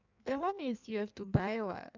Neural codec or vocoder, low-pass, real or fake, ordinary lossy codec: codec, 16 kHz in and 24 kHz out, 1.1 kbps, FireRedTTS-2 codec; 7.2 kHz; fake; none